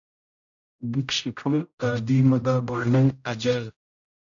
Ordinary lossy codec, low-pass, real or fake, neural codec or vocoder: MP3, 96 kbps; 7.2 kHz; fake; codec, 16 kHz, 0.5 kbps, X-Codec, HuBERT features, trained on general audio